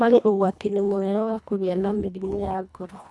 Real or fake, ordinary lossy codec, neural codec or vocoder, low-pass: fake; none; codec, 24 kHz, 1.5 kbps, HILCodec; none